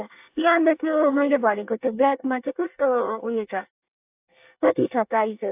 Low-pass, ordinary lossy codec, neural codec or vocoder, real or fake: 3.6 kHz; AAC, 32 kbps; codec, 24 kHz, 1 kbps, SNAC; fake